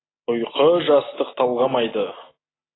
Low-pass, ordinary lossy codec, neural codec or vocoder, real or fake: 7.2 kHz; AAC, 16 kbps; none; real